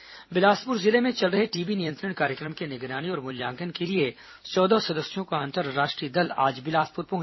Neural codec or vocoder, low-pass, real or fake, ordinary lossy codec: vocoder, 44.1 kHz, 128 mel bands every 256 samples, BigVGAN v2; 7.2 kHz; fake; MP3, 24 kbps